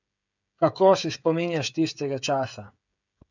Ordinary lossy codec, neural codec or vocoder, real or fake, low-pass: none; codec, 16 kHz, 16 kbps, FreqCodec, smaller model; fake; 7.2 kHz